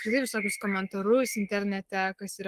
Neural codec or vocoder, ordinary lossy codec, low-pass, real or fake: codec, 44.1 kHz, 7.8 kbps, Pupu-Codec; Opus, 24 kbps; 14.4 kHz; fake